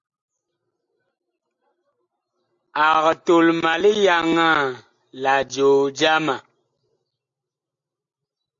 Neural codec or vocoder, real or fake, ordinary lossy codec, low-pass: none; real; AAC, 64 kbps; 7.2 kHz